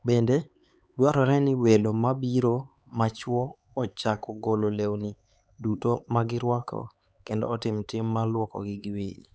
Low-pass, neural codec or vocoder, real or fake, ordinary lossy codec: none; codec, 16 kHz, 4 kbps, X-Codec, HuBERT features, trained on LibriSpeech; fake; none